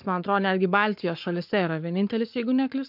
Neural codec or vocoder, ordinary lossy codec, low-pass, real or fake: codec, 44.1 kHz, 7.8 kbps, Pupu-Codec; MP3, 48 kbps; 5.4 kHz; fake